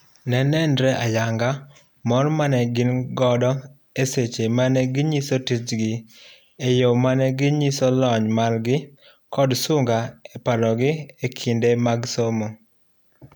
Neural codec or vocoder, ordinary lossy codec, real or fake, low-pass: none; none; real; none